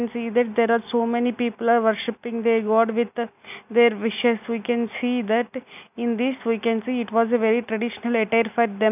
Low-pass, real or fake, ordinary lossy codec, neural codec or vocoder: 3.6 kHz; real; none; none